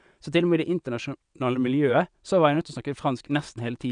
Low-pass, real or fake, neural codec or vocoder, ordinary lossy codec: 9.9 kHz; fake; vocoder, 22.05 kHz, 80 mel bands, WaveNeXt; none